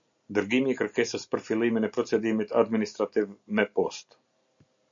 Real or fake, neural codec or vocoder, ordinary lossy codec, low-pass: real; none; AAC, 64 kbps; 7.2 kHz